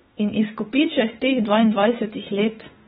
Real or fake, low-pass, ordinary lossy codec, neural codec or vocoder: fake; 19.8 kHz; AAC, 16 kbps; autoencoder, 48 kHz, 32 numbers a frame, DAC-VAE, trained on Japanese speech